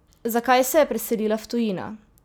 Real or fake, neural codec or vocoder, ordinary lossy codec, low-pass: real; none; none; none